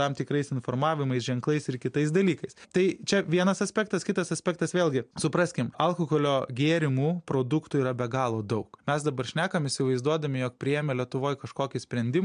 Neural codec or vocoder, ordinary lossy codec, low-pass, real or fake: none; MP3, 64 kbps; 9.9 kHz; real